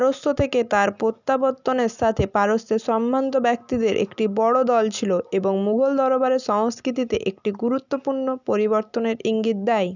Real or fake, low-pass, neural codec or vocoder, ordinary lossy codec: real; 7.2 kHz; none; none